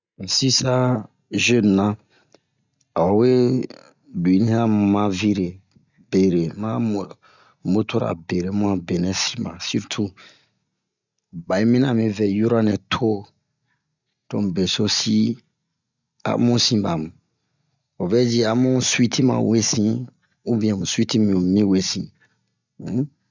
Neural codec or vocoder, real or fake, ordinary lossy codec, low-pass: none; real; none; 7.2 kHz